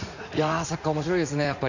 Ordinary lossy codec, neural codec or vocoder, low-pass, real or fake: none; vocoder, 44.1 kHz, 80 mel bands, Vocos; 7.2 kHz; fake